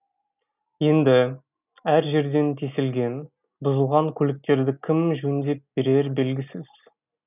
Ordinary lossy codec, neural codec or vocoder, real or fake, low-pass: none; none; real; 3.6 kHz